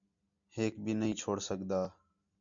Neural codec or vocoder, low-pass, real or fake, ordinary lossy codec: none; 7.2 kHz; real; MP3, 64 kbps